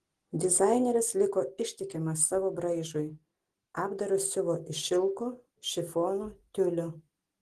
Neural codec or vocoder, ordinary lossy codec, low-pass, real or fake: none; Opus, 16 kbps; 14.4 kHz; real